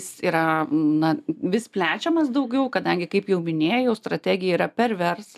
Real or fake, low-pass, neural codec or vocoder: real; 14.4 kHz; none